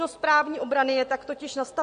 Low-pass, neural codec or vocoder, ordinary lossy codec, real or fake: 9.9 kHz; none; MP3, 48 kbps; real